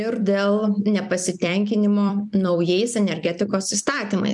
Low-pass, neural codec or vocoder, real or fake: 10.8 kHz; vocoder, 44.1 kHz, 128 mel bands every 256 samples, BigVGAN v2; fake